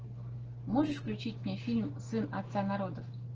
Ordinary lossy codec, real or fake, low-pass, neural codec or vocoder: Opus, 16 kbps; real; 7.2 kHz; none